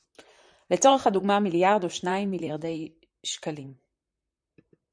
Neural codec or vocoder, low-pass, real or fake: vocoder, 44.1 kHz, 128 mel bands, Pupu-Vocoder; 9.9 kHz; fake